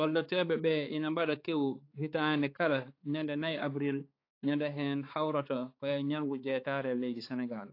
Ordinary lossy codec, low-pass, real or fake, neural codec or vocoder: AAC, 32 kbps; 5.4 kHz; fake; codec, 16 kHz, 4 kbps, X-Codec, HuBERT features, trained on balanced general audio